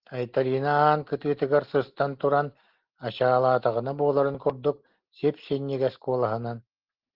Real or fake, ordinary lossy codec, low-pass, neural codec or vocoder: real; Opus, 16 kbps; 5.4 kHz; none